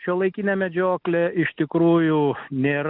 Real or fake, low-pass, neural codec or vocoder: real; 5.4 kHz; none